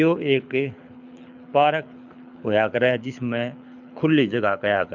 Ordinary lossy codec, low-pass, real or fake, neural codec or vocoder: none; 7.2 kHz; fake; codec, 24 kHz, 6 kbps, HILCodec